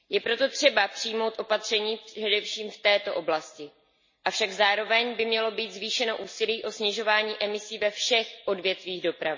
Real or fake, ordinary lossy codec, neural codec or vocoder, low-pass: real; MP3, 32 kbps; none; 7.2 kHz